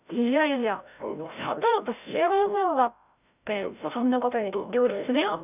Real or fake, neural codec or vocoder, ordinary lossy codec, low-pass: fake; codec, 16 kHz, 0.5 kbps, FreqCodec, larger model; none; 3.6 kHz